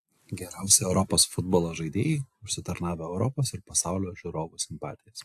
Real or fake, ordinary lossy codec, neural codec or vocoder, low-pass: real; AAC, 64 kbps; none; 14.4 kHz